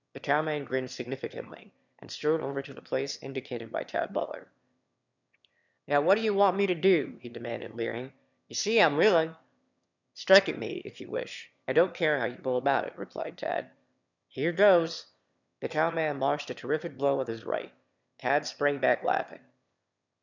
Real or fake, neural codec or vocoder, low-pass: fake; autoencoder, 22.05 kHz, a latent of 192 numbers a frame, VITS, trained on one speaker; 7.2 kHz